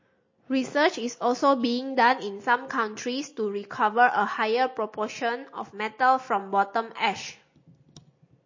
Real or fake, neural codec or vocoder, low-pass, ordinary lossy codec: real; none; 7.2 kHz; MP3, 32 kbps